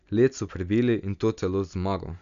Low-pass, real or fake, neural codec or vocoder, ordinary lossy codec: 7.2 kHz; real; none; none